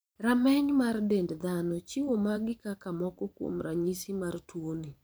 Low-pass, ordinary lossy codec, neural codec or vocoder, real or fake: none; none; vocoder, 44.1 kHz, 128 mel bands every 512 samples, BigVGAN v2; fake